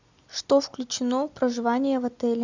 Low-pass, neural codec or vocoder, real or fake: 7.2 kHz; none; real